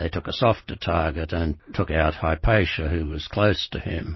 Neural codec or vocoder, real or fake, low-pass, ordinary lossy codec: none; real; 7.2 kHz; MP3, 24 kbps